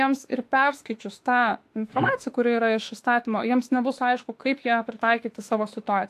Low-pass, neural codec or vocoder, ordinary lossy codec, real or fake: 14.4 kHz; autoencoder, 48 kHz, 32 numbers a frame, DAC-VAE, trained on Japanese speech; AAC, 64 kbps; fake